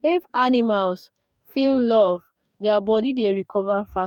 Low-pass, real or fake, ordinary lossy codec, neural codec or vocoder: 19.8 kHz; fake; none; codec, 44.1 kHz, 2.6 kbps, DAC